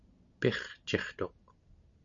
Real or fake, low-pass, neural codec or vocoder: real; 7.2 kHz; none